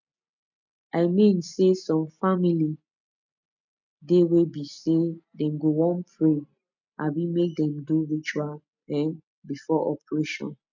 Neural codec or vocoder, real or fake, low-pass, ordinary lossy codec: none; real; 7.2 kHz; none